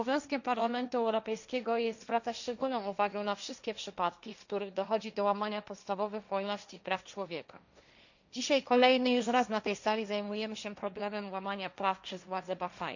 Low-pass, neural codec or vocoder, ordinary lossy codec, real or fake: 7.2 kHz; codec, 16 kHz, 1.1 kbps, Voila-Tokenizer; none; fake